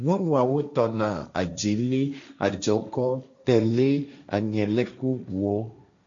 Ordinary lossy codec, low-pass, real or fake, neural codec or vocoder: MP3, 64 kbps; 7.2 kHz; fake; codec, 16 kHz, 1.1 kbps, Voila-Tokenizer